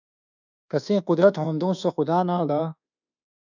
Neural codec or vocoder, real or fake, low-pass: codec, 24 kHz, 1.2 kbps, DualCodec; fake; 7.2 kHz